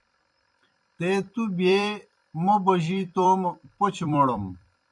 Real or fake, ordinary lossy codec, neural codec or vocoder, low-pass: fake; MP3, 96 kbps; vocoder, 44.1 kHz, 128 mel bands every 256 samples, BigVGAN v2; 10.8 kHz